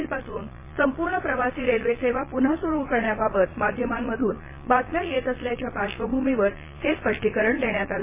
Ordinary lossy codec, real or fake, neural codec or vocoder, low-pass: MP3, 16 kbps; fake; vocoder, 22.05 kHz, 80 mel bands, Vocos; 3.6 kHz